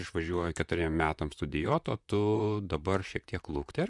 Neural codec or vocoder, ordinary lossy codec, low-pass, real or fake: vocoder, 44.1 kHz, 128 mel bands, Pupu-Vocoder; MP3, 96 kbps; 10.8 kHz; fake